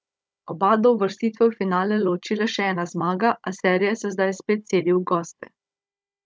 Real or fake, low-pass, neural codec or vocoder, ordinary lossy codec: fake; none; codec, 16 kHz, 16 kbps, FunCodec, trained on Chinese and English, 50 frames a second; none